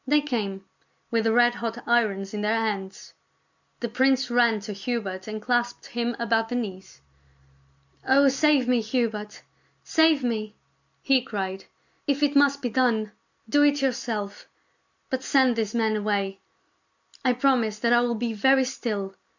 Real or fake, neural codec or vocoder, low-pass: real; none; 7.2 kHz